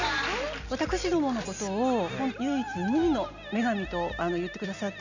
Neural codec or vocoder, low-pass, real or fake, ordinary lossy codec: none; 7.2 kHz; real; none